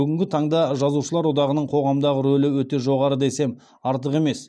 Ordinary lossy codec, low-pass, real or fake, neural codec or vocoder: none; none; real; none